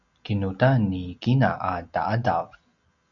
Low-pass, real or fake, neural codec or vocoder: 7.2 kHz; real; none